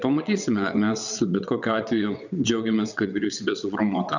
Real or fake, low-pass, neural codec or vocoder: fake; 7.2 kHz; vocoder, 22.05 kHz, 80 mel bands, WaveNeXt